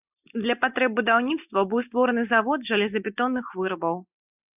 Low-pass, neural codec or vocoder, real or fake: 3.6 kHz; none; real